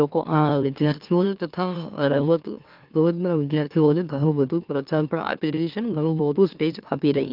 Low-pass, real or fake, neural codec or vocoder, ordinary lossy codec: 5.4 kHz; fake; autoencoder, 44.1 kHz, a latent of 192 numbers a frame, MeloTTS; Opus, 24 kbps